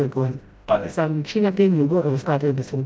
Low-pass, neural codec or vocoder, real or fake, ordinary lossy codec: none; codec, 16 kHz, 0.5 kbps, FreqCodec, smaller model; fake; none